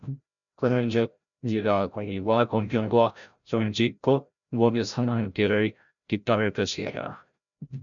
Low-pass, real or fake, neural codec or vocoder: 7.2 kHz; fake; codec, 16 kHz, 0.5 kbps, FreqCodec, larger model